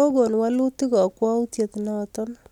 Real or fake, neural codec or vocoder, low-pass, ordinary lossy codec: real; none; 19.8 kHz; none